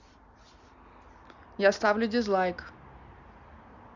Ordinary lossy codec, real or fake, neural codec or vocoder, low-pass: none; real; none; 7.2 kHz